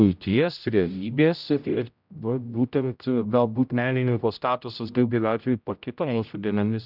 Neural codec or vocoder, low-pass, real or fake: codec, 16 kHz, 0.5 kbps, X-Codec, HuBERT features, trained on general audio; 5.4 kHz; fake